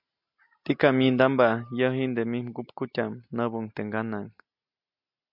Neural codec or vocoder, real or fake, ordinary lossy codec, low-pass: none; real; MP3, 48 kbps; 5.4 kHz